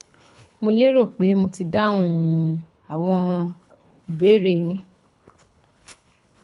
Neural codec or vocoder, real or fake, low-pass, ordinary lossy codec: codec, 24 kHz, 3 kbps, HILCodec; fake; 10.8 kHz; none